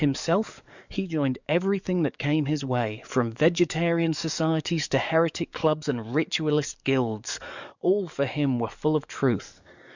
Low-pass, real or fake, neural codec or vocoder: 7.2 kHz; fake; codec, 44.1 kHz, 7.8 kbps, DAC